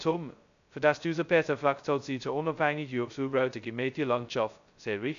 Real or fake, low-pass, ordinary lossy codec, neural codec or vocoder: fake; 7.2 kHz; AAC, 96 kbps; codec, 16 kHz, 0.2 kbps, FocalCodec